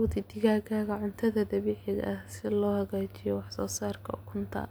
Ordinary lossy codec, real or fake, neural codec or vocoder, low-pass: none; real; none; none